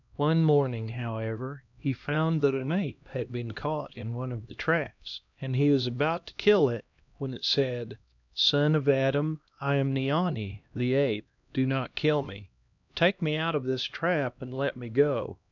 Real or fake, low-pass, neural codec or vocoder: fake; 7.2 kHz; codec, 16 kHz, 1 kbps, X-Codec, HuBERT features, trained on LibriSpeech